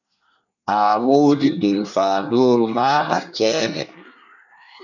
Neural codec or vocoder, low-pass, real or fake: codec, 24 kHz, 1 kbps, SNAC; 7.2 kHz; fake